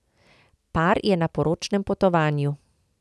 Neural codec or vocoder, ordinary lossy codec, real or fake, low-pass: none; none; real; none